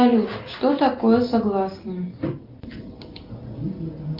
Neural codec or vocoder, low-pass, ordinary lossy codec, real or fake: none; 5.4 kHz; Opus, 24 kbps; real